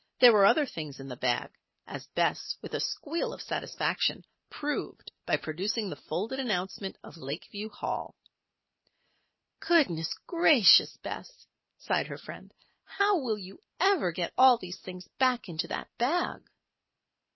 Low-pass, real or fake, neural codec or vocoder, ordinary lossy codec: 7.2 kHz; real; none; MP3, 24 kbps